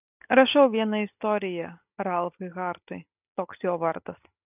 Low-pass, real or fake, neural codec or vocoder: 3.6 kHz; real; none